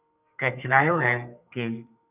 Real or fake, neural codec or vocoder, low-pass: fake; codec, 32 kHz, 1.9 kbps, SNAC; 3.6 kHz